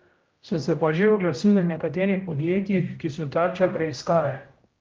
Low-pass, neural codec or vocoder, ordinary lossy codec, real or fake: 7.2 kHz; codec, 16 kHz, 0.5 kbps, X-Codec, HuBERT features, trained on general audio; Opus, 24 kbps; fake